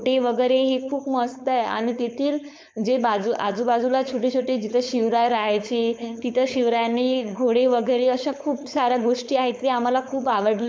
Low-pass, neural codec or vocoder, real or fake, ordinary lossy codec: none; codec, 16 kHz, 4.8 kbps, FACodec; fake; none